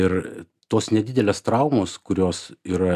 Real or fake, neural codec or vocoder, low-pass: real; none; 14.4 kHz